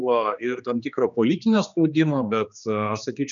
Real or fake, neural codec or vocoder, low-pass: fake; codec, 16 kHz, 2 kbps, X-Codec, HuBERT features, trained on general audio; 7.2 kHz